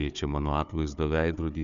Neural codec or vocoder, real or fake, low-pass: codec, 16 kHz, 4 kbps, FunCodec, trained on Chinese and English, 50 frames a second; fake; 7.2 kHz